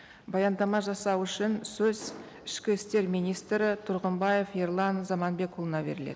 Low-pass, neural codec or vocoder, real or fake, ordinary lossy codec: none; none; real; none